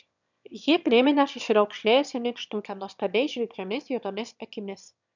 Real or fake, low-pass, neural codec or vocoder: fake; 7.2 kHz; autoencoder, 22.05 kHz, a latent of 192 numbers a frame, VITS, trained on one speaker